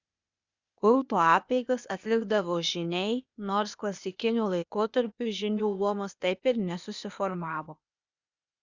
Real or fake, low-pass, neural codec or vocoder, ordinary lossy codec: fake; 7.2 kHz; codec, 16 kHz, 0.8 kbps, ZipCodec; Opus, 64 kbps